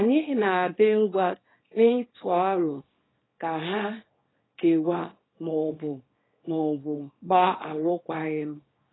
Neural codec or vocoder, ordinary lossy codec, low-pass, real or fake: codec, 24 kHz, 0.9 kbps, WavTokenizer, small release; AAC, 16 kbps; 7.2 kHz; fake